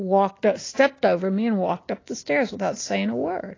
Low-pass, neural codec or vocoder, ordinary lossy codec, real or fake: 7.2 kHz; none; AAC, 32 kbps; real